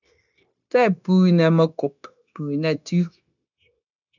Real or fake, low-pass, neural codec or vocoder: fake; 7.2 kHz; codec, 16 kHz, 0.9 kbps, LongCat-Audio-Codec